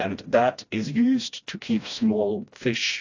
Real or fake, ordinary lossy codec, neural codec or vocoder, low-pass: fake; AAC, 48 kbps; codec, 16 kHz, 1 kbps, FreqCodec, smaller model; 7.2 kHz